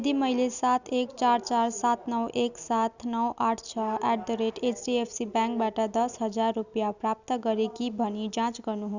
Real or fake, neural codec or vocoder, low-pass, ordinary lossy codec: real; none; 7.2 kHz; none